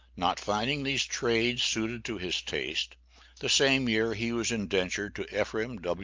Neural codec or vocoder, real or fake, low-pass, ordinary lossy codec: none; real; 7.2 kHz; Opus, 24 kbps